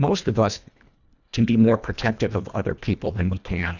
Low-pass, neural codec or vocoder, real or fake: 7.2 kHz; codec, 24 kHz, 1.5 kbps, HILCodec; fake